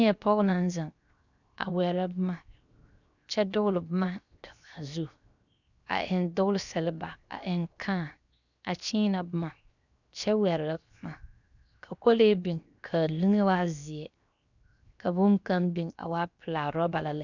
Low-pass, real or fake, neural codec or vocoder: 7.2 kHz; fake; codec, 16 kHz, 0.7 kbps, FocalCodec